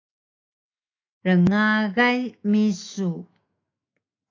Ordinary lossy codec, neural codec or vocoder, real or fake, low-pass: AAC, 32 kbps; autoencoder, 48 kHz, 128 numbers a frame, DAC-VAE, trained on Japanese speech; fake; 7.2 kHz